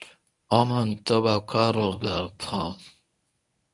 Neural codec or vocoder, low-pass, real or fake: codec, 24 kHz, 0.9 kbps, WavTokenizer, medium speech release version 1; 10.8 kHz; fake